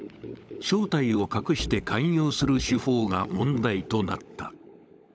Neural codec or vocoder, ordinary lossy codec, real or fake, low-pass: codec, 16 kHz, 16 kbps, FunCodec, trained on LibriTTS, 50 frames a second; none; fake; none